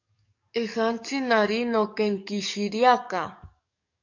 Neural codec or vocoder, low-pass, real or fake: codec, 44.1 kHz, 7.8 kbps, DAC; 7.2 kHz; fake